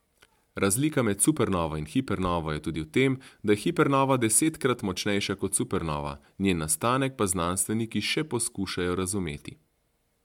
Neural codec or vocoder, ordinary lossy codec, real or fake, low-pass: none; MP3, 96 kbps; real; 19.8 kHz